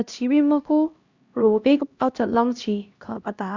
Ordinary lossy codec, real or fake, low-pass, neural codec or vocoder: Opus, 64 kbps; fake; 7.2 kHz; codec, 16 kHz, 1 kbps, X-Codec, HuBERT features, trained on LibriSpeech